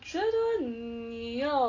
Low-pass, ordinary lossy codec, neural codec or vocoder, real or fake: 7.2 kHz; AAC, 32 kbps; none; real